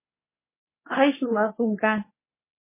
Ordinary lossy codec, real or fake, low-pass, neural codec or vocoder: MP3, 16 kbps; fake; 3.6 kHz; codec, 24 kHz, 0.9 kbps, WavTokenizer, medium speech release version 2